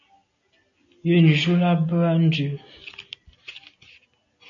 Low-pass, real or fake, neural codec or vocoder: 7.2 kHz; real; none